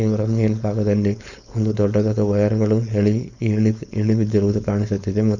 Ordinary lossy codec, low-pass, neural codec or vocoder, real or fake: none; 7.2 kHz; codec, 16 kHz, 4.8 kbps, FACodec; fake